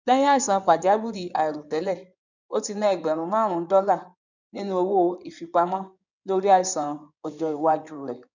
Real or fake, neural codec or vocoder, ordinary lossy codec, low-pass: fake; codec, 16 kHz in and 24 kHz out, 2.2 kbps, FireRedTTS-2 codec; none; 7.2 kHz